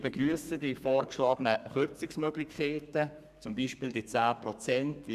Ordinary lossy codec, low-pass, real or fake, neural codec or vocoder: none; 14.4 kHz; fake; codec, 32 kHz, 1.9 kbps, SNAC